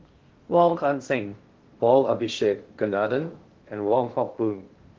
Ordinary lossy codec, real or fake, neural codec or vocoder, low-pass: Opus, 16 kbps; fake; codec, 16 kHz in and 24 kHz out, 0.6 kbps, FocalCodec, streaming, 2048 codes; 7.2 kHz